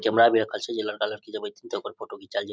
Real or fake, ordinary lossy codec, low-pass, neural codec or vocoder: real; none; none; none